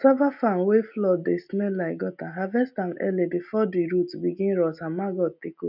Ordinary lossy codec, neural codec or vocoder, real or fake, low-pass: none; none; real; 5.4 kHz